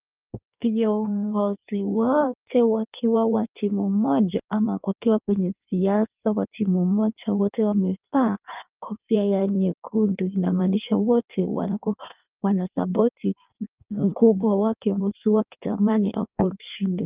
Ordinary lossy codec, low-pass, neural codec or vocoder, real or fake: Opus, 24 kbps; 3.6 kHz; codec, 16 kHz in and 24 kHz out, 1.1 kbps, FireRedTTS-2 codec; fake